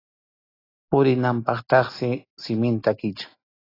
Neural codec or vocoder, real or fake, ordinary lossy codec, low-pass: none; real; AAC, 24 kbps; 5.4 kHz